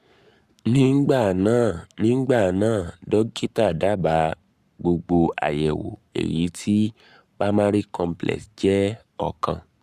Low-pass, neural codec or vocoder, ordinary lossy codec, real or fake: 14.4 kHz; codec, 44.1 kHz, 7.8 kbps, Pupu-Codec; Opus, 64 kbps; fake